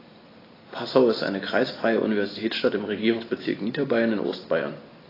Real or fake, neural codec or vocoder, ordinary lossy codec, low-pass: real; none; AAC, 24 kbps; 5.4 kHz